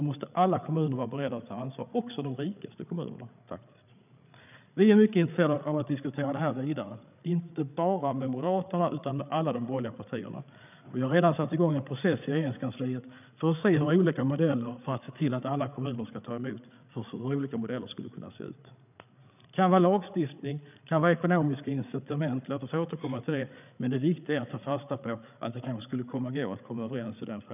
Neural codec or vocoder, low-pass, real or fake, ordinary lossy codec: codec, 16 kHz, 8 kbps, FreqCodec, larger model; 3.6 kHz; fake; none